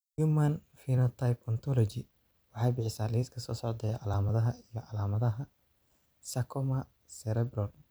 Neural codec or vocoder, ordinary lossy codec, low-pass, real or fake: vocoder, 44.1 kHz, 128 mel bands every 256 samples, BigVGAN v2; none; none; fake